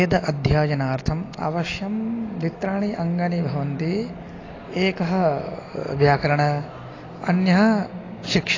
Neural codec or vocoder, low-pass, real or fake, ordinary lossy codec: none; 7.2 kHz; real; AAC, 32 kbps